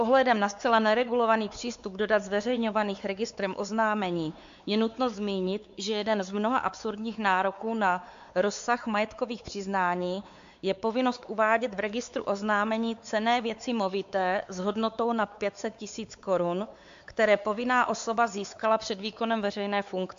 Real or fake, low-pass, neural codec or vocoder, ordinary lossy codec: fake; 7.2 kHz; codec, 16 kHz, 4 kbps, X-Codec, WavLM features, trained on Multilingual LibriSpeech; AAC, 64 kbps